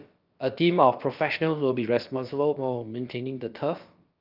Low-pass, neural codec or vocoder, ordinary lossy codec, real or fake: 5.4 kHz; codec, 16 kHz, about 1 kbps, DyCAST, with the encoder's durations; Opus, 24 kbps; fake